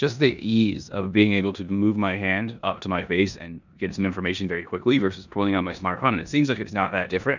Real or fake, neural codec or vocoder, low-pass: fake; codec, 16 kHz in and 24 kHz out, 0.9 kbps, LongCat-Audio-Codec, four codebook decoder; 7.2 kHz